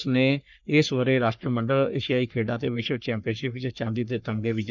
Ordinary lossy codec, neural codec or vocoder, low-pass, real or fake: none; codec, 44.1 kHz, 3.4 kbps, Pupu-Codec; 7.2 kHz; fake